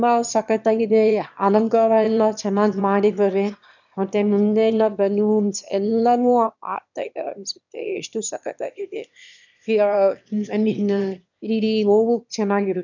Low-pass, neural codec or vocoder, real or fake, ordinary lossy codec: 7.2 kHz; autoencoder, 22.05 kHz, a latent of 192 numbers a frame, VITS, trained on one speaker; fake; none